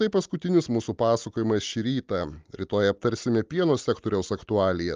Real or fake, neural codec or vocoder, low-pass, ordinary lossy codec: real; none; 7.2 kHz; Opus, 32 kbps